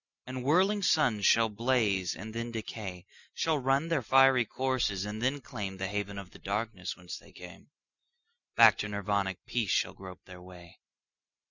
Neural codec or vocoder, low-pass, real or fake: none; 7.2 kHz; real